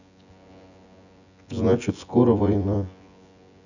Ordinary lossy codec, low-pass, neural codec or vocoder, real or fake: none; 7.2 kHz; vocoder, 24 kHz, 100 mel bands, Vocos; fake